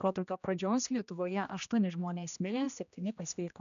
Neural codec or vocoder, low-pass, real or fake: codec, 16 kHz, 1 kbps, X-Codec, HuBERT features, trained on general audio; 7.2 kHz; fake